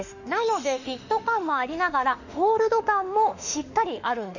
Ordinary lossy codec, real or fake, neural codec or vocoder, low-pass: none; fake; autoencoder, 48 kHz, 32 numbers a frame, DAC-VAE, trained on Japanese speech; 7.2 kHz